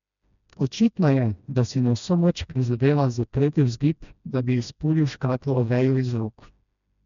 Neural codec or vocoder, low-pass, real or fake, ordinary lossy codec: codec, 16 kHz, 1 kbps, FreqCodec, smaller model; 7.2 kHz; fake; none